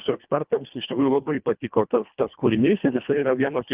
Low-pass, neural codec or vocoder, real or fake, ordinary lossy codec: 3.6 kHz; codec, 24 kHz, 1.5 kbps, HILCodec; fake; Opus, 32 kbps